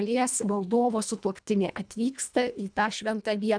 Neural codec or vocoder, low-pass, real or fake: codec, 24 kHz, 1.5 kbps, HILCodec; 9.9 kHz; fake